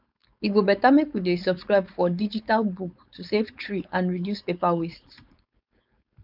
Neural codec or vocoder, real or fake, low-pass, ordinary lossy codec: codec, 16 kHz, 4.8 kbps, FACodec; fake; 5.4 kHz; none